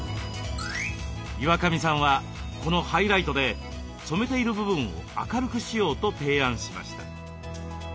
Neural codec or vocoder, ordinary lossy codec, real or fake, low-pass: none; none; real; none